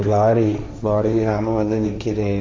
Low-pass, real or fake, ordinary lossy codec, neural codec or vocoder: none; fake; none; codec, 16 kHz, 1.1 kbps, Voila-Tokenizer